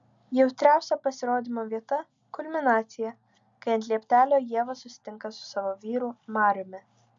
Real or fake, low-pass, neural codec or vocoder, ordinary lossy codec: real; 7.2 kHz; none; MP3, 64 kbps